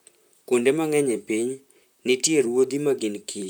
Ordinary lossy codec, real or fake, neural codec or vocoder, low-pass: none; fake; vocoder, 44.1 kHz, 128 mel bands, Pupu-Vocoder; none